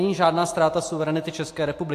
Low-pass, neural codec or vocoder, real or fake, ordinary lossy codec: 14.4 kHz; none; real; AAC, 64 kbps